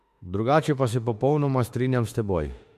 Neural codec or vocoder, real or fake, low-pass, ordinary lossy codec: autoencoder, 48 kHz, 32 numbers a frame, DAC-VAE, trained on Japanese speech; fake; 14.4 kHz; MP3, 64 kbps